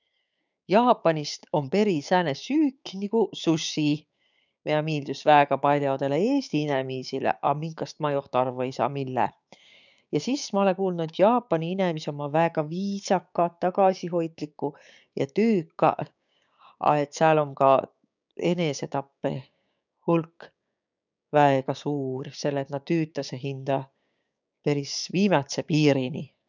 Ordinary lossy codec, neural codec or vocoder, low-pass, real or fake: none; codec, 16 kHz, 6 kbps, DAC; 7.2 kHz; fake